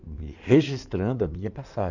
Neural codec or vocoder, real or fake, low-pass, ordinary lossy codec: codec, 16 kHz in and 24 kHz out, 2.2 kbps, FireRedTTS-2 codec; fake; 7.2 kHz; none